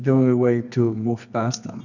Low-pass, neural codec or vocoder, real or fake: 7.2 kHz; codec, 24 kHz, 0.9 kbps, WavTokenizer, medium music audio release; fake